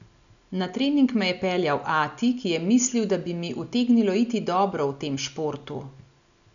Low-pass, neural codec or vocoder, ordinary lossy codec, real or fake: 7.2 kHz; none; none; real